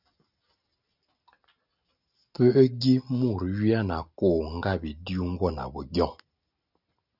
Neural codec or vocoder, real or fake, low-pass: none; real; 5.4 kHz